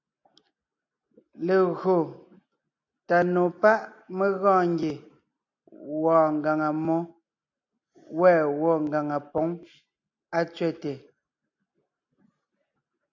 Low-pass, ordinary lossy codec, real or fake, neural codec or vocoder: 7.2 kHz; AAC, 48 kbps; real; none